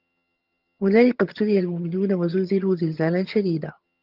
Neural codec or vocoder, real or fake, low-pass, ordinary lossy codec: vocoder, 22.05 kHz, 80 mel bands, HiFi-GAN; fake; 5.4 kHz; Opus, 24 kbps